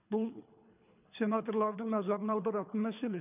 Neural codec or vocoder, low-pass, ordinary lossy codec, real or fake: codec, 24 kHz, 3 kbps, HILCodec; 3.6 kHz; none; fake